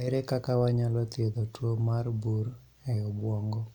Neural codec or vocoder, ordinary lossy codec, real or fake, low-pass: none; none; real; 19.8 kHz